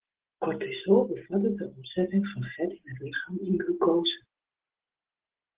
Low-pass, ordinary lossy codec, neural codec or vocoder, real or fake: 3.6 kHz; Opus, 16 kbps; none; real